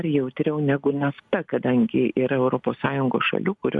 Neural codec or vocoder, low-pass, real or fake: none; 9.9 kHz; real